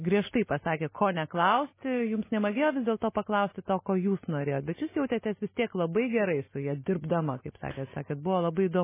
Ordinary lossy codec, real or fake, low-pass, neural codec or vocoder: MP3, 16 kbps; real; 3.6 kHz; none